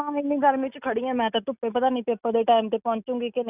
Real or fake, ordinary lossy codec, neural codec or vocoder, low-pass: real; none; none; 3.6 kHz